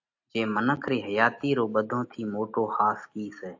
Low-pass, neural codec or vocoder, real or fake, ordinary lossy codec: 7.2 kHz; none; real; AAC, 48 kbps